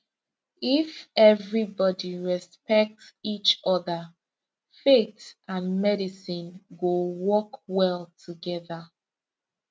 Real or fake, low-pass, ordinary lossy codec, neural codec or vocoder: real; none; none; none